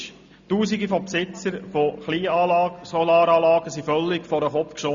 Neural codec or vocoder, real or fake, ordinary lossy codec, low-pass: none; real; Opus, 64 kbps; 7.2 kHz